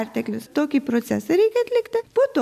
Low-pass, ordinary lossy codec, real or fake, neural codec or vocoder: 14.4 kHz; AAC, 96 kbps; real; none